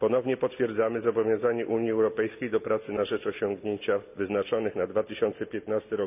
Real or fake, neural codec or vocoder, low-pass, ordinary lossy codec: real; none; 3.6 kHz; none